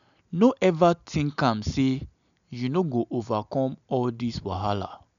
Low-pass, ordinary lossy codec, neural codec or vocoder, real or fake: 7.2 kHz; none; none; real